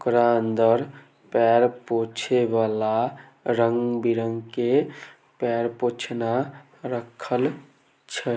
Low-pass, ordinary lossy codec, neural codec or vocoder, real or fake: none; none; none; real